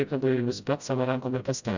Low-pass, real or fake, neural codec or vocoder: 7.2 kHz; fake; codec, 16 kHz, 0.5 kbps, FreqCodec, smaller model